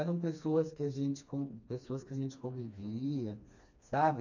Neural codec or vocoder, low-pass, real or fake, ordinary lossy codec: codec, 16 kHz, 2 kbps, FreqCodec, smaller model; 7.2 kHz; fake; none